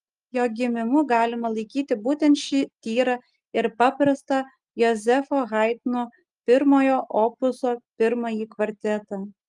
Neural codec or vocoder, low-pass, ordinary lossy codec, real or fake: none; 10.8 kHz; Opus, 32 kbps; real